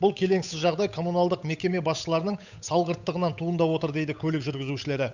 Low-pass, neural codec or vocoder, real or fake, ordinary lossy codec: 7.2 kHz; codec, 16 kHz, 8 kbps, FunCodec, trained on Chinese and English, 25 frames a second; fake; none